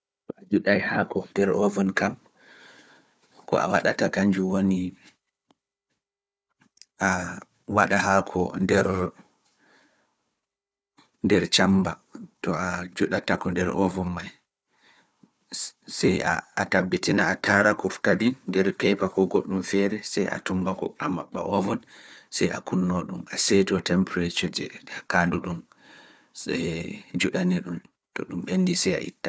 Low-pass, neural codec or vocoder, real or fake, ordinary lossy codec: none; codec, 16 kHz, 4 kbps, FunCodec, trained on Chinese and English, 50 frames a second; fake; none